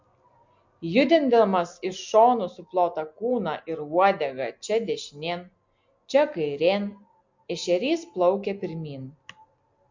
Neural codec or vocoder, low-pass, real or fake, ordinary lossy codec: none; 7.2 kHz; real; MP3, 48 kbps